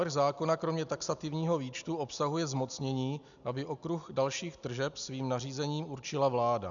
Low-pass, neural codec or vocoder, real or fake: 7.2 kHz; none; real